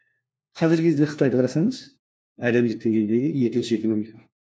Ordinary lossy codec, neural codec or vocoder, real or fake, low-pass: none; codec, 16 kHz, 1 kbps, FunCodec, trained on LibriTTS, 50 frames a second; fake; none